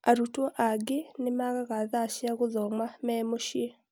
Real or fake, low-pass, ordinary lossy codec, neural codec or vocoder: real; none; none; none